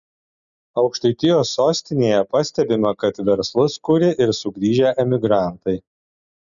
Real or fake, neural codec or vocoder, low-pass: real; none; 7.2 kHz